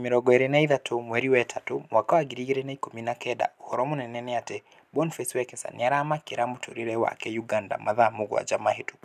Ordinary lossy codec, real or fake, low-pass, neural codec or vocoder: none; real; 14.4 kHz; none